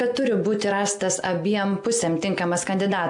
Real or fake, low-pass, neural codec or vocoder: real; 10.8 kHz; none